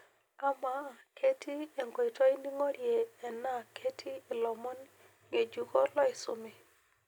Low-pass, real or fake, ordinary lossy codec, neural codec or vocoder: none; real; none; none